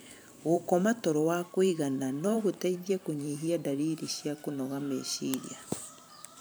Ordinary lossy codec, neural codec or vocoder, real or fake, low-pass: none; vocoder, 44.1 kHz, 128 mel bands every 512 samples, BigVGAN v2; fake; none